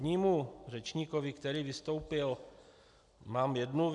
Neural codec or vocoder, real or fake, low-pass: none; real; 10.8 kHz